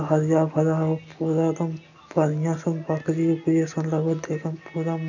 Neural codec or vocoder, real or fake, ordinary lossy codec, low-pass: none; real; none; 7.2 kHz